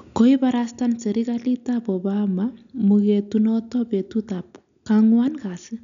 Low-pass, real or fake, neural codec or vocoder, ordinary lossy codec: 7.2 kHz; real; none; none